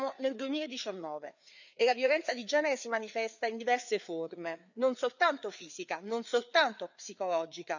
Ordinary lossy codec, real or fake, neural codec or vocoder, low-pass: none; fake; codec, 16 kHz, 4 kbps, FreqCodec, larger model; 7.2 kHz